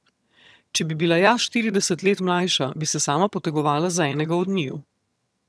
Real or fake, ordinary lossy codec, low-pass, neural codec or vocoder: fake; none; none; vocoder, 22.05 kHz, 80 mel bands, HiFi-GAN